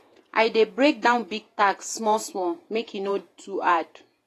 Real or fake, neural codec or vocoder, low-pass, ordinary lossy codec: fake; vocoder, 48 kHz, 128 mel bands, Vocos; 14.4 kHz; AAC, 48 kbps